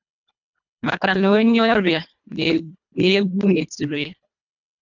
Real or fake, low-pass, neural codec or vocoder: fake; 7.2 kHz; codec, 24 kHz, 1.5 kbps, HILCodec